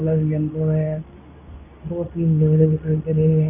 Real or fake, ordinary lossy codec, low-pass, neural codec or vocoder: fake; none; 3.6 kHz; codec, 24 kHz, 0.9 kbps, WavTokenizer, medium speech release version 2